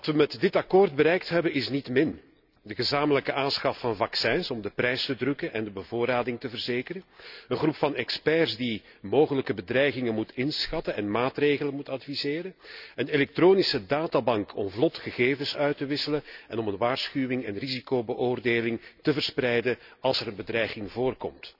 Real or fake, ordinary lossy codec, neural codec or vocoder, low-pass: real; none; none; 5.4 kHz